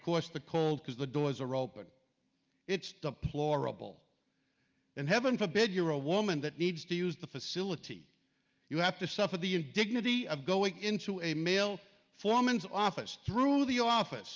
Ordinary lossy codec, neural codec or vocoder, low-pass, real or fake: Opus, 24 kbps; none; 7.2 kHz; real